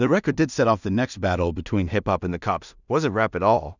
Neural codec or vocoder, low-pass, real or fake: codec, 16 kHz in and 24 kHz out, 0.4 kbps, LongCat-Audio-Codec, two codebook decoder; 7.2 kHz; fake